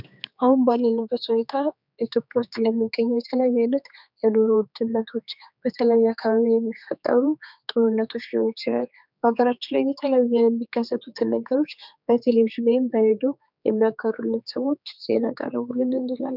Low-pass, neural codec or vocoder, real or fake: 5.4 kHz; codec, 16 kHz, 4 kbps, X-Codec, HuBERT features, trained on general audio; fake